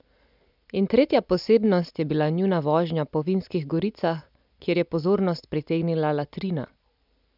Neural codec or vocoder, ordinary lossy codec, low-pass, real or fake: none; none; 5.4 kHz; real